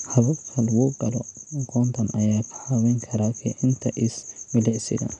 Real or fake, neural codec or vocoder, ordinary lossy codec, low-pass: real; none; none; 10.8 kHz